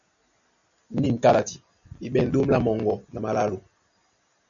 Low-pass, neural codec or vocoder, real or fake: 7.2 kHz; none; real